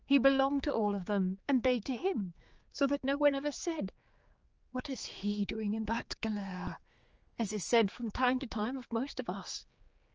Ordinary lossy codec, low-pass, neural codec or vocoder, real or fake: Opus, 24 kbps; 7.2 kHz; codec, 16 kHz, 4 kbps, X-Codec, HuBERT features, trained on general audio; fake